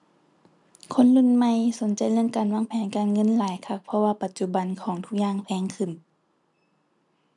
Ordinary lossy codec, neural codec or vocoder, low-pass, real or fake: none; none; 10.8 kHz; real